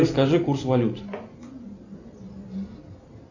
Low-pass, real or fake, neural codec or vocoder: 7.2 kHz; real; none